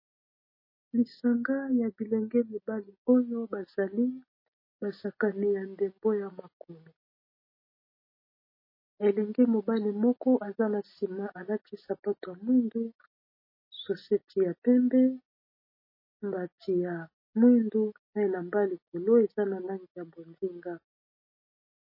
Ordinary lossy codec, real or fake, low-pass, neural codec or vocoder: MP3, 24 kbps; real; 5.4 kHz; none